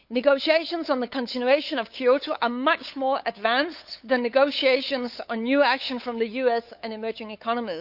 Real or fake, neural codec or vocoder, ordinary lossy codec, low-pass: fake; codec, 16 kHz, 8 kbps, FunCodec, trained on LibriTTS, 25 frames a second; none; 5.4 kHz